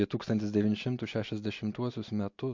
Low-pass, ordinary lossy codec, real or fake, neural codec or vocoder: 7.2 kHz; MP3, 48 kbps; real; none